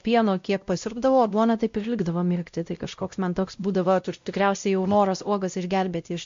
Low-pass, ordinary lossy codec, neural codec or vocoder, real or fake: 7.2 kHz; MP3, 48 kbps; codec, 16 kHz, 0.5 kbps, X-Codec, WavLM features, trained on Multilingual LibriSpeech; fake